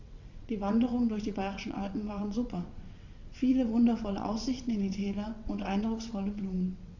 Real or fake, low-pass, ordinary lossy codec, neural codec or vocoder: fake; 7.2 kHz; Opus, 64 kbps; vocoder, 22.05 kHz, 80 mel bands, WaveNeXt